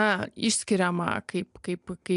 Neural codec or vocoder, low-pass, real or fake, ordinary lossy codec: none; 10.8 kHz; real; AAC, 96 kbps